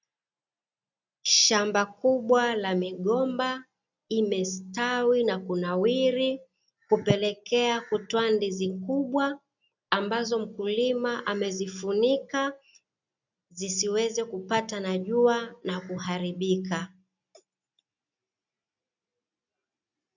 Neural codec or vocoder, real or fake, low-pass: none; real; 7.2 kHz